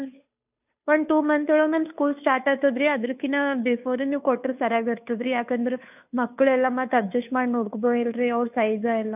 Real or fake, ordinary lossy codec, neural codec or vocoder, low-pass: fake; none; codec, 16 kHz, 2 kbps, FunCodec, trained on Chinese and English, 25 frames a second; 3.6 kHz